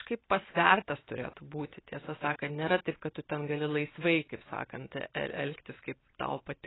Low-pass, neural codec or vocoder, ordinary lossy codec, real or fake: 7.2 kHz; none; AAC, 16 kbps; real